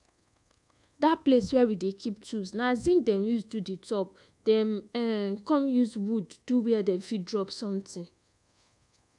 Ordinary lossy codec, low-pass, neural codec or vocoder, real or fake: none; 10.8 kHz; codec, 24 kHz, 1.2 kbps, DualCodec; fake